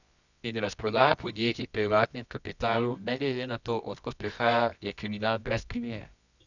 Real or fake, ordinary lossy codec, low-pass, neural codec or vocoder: fake; none; 7.2 kHz; codec, 24 kHz, 0.9 kbps, WavTokenizer, medium music audio release